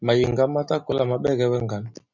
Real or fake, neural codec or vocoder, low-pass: real; none; 7.2 kHz